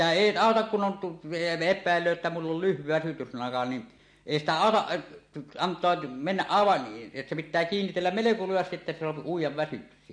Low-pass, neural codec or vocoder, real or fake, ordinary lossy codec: 9.9 kHz; none; real; MP3, 48 kbps